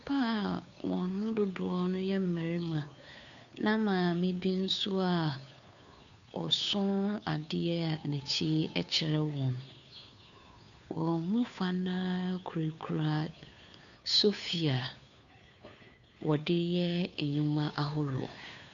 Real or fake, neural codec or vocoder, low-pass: fake; codec, 16 kHz, 2 kbps, FunCodec, trained on Chinese and English, 25 frames a second; 7.2 kHz